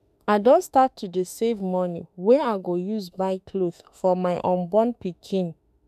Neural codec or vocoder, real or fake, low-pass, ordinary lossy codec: autoencoder, 48 kHz, 32 numbers a frame, DAC-VAE, trained on Japanese speech; fake; 14.4 kHz; none